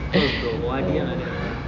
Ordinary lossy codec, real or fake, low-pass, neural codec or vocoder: none; real; 7.2 kHz; none